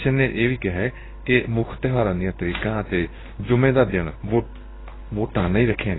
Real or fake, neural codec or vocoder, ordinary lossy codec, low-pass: fake; codec, 16 kHz in and 24 kHz out, 1 kbps, XY-Tokenizer; AAC, 16 kbps; 7.2 kHz